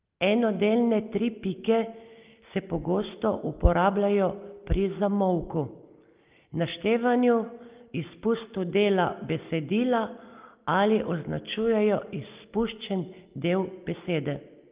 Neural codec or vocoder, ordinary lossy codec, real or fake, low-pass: none; Opus, 24 kbps; real; 3.6 kHz